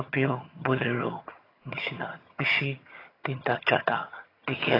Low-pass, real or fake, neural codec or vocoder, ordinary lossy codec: 5.4 kHz; fake; vocoder, 22.05 kHz, 80 mel bands, HiFi-GAN; AAC, 24 kbps